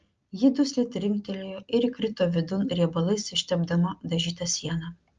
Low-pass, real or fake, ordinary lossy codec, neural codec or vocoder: 7.2 kHz; real; Opus, 24 kbps; none